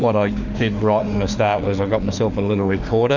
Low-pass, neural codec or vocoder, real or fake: 7.2 kHz; autoencoder, 48 kHz, 32 numbers a frame, DAC-VAE, trained on Japanese speech; fake